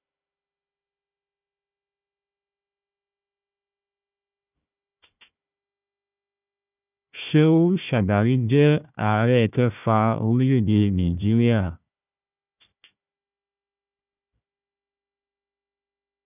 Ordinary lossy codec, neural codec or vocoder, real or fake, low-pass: none; codec, 16 kHz, 1 kbps, FunCodec, trained on Chinese and English, 50 frames a second; fake; 3.6 kHz